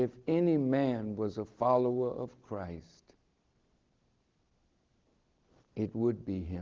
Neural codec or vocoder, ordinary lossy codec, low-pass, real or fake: none; Opus, 16 kbps; 7.2 kHz; real